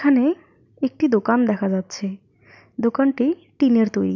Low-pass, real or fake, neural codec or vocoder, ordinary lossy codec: 7.2 kHz; real; none; none